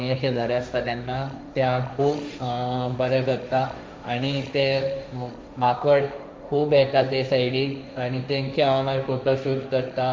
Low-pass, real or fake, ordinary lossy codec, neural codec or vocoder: none; fake; none; codec, 16 kHz, 1.1 kbps, Voila-Tokenizer